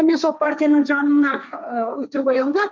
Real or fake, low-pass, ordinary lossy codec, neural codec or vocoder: fake; none; none; codec, 16 kHz, 1.1 kbps, Voila-Tokenizer